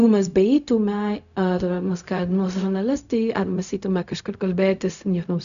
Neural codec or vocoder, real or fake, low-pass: codec, 16 kHz, 0.4 kbps, LongCat-Audio-Codec; fake; 7.2 kHz